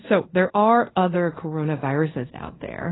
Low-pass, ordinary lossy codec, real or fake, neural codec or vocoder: 7.2 kHz; AAC, 16 kbps; fake; codec, 24 kHz, 0.5 kbps, DualCodec